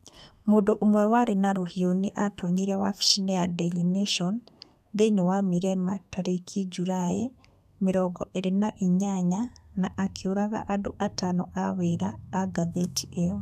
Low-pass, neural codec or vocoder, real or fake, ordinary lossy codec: 14.4 kHz; codec, 32 kHz, 1.9 kbps, SNAC; fake; none